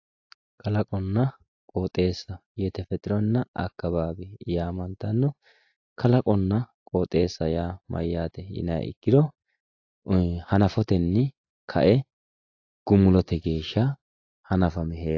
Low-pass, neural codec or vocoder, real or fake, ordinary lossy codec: 7.2 kHz; none; real; AAC, 48 kbps